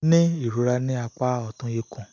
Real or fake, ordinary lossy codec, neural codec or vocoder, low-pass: real; none; none; 7.2 kHz